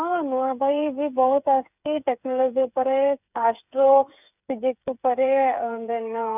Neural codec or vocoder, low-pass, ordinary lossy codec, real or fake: codec, 16 kHz, 8 kbps, FreqCodec, smaller model; 3.6 kHz; none; fake